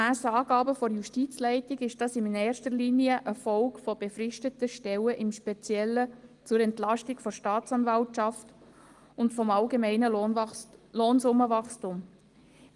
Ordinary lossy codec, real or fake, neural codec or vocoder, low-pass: Opus, 24 kbps; real; none; 10.8 kHz